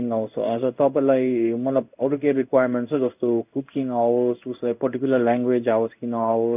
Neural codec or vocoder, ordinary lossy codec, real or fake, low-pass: codec, 16 kHz in and 24 kHz out, 1 kbps, XY-Tokenizer; none; fake; 3.6 kHz